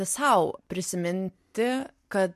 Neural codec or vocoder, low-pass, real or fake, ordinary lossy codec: none; 14.4 kHz; real; MP3, 64 kbps